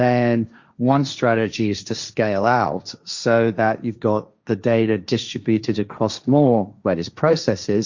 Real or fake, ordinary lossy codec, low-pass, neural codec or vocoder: fake; Opus, 64 kbps; 7.2 kHz; codec, 16 kHz, 1.1 kbps, Voila-Tokenizer